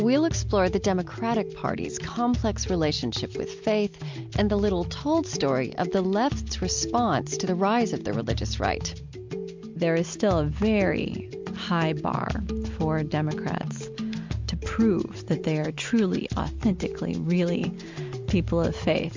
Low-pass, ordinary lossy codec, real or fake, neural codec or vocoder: 7.2 kHz; MP3, 64 kbps; real; none